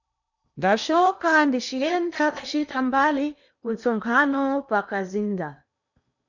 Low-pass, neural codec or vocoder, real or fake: 7.2 kHz; codec, 16 kHz in and 24 kHz out, 0.8 kbps, FocalCodec, streaming, 65536 codes; fake